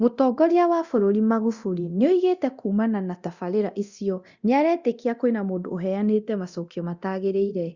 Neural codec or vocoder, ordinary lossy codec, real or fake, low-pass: codec, 24 kHz, 0.9 kbps, DualCodec; Opus, 64 kbps; fake; 7.2 kHz